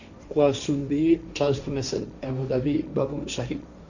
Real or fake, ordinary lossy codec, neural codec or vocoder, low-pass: fake; none; codec, 16 kHz, 1.1 kbps, Voila-Tokenizer; 7.2 kHz